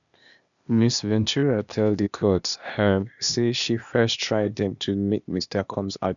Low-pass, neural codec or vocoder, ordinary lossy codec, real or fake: 7.2 kHz; codec, 16 kHz, 0.8 kbps, ZipCodec; none; fake